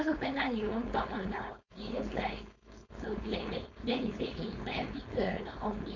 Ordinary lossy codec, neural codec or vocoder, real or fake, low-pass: none; codec, 16 kHz, 4.8 kbps, FACodec; fake; 7.2 kHz